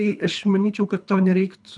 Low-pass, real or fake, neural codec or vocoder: 10.8 kHz; fake; codec, 24 kHz, 3 kbps, HILCodec